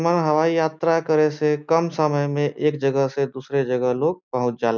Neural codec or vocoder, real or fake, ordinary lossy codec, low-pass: none; real; none; none